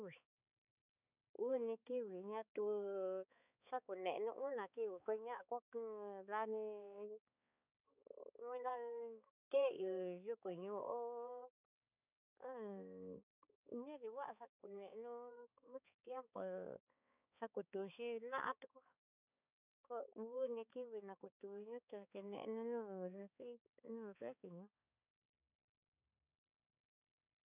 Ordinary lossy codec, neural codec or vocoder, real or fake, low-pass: none; codec, 16 kHz, 4 kbps, X-Codec, HuBERT features, trained on balanced general audio; fake; 3.6 kHz